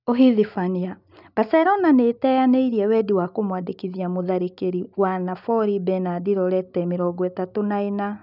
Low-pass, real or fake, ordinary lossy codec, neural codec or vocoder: 5.4 kHz; real; none; none